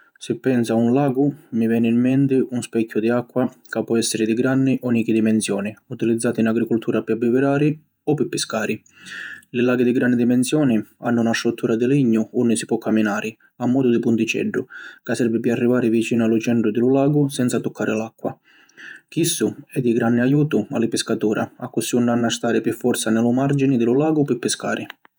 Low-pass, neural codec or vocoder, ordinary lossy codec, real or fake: none; vocoder, 48 kHz, 128 mel bands, Vocos; none; fake